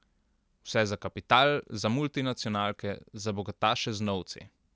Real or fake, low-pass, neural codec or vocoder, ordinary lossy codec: real; none; none; none